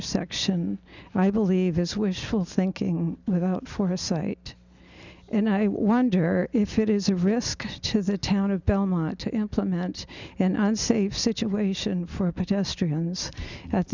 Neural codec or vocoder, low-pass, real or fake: none; 7.2 kHz; real